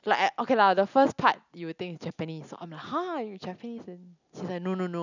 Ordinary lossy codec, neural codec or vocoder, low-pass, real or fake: none; none; 7.2 kHz; real